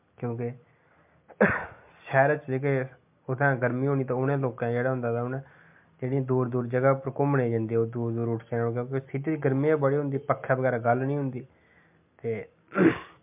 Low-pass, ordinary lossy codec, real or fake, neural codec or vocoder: 3.6 kHz; MP3, 32 kbps; real; none